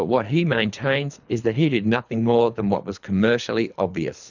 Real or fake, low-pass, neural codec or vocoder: fake; 7.2 kHz; codec, 24 kHz, 3 kbps, HILCodec